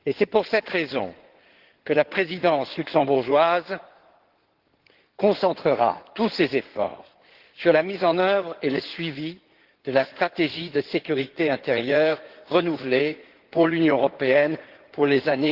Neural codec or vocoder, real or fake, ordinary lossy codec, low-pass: codec, 16 kHz in and 24 kHz out, 2.2 kbps, FireRedTTS-2 codec; fake; Opus, 16 kbps; 5.4 kHz